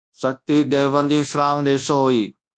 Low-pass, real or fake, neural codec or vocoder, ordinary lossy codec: 9.9 kHz; fake; codec, 24 kHz, 0.9 kbps, WavTokenizer, large speech release; AAC, 64 kbps